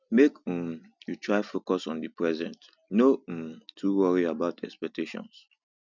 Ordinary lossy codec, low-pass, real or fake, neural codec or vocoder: none; 7.2 kHz; real; none